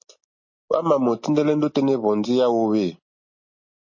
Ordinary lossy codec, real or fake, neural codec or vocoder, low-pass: MP3, 32 kbps; real; none; 7.2 kHz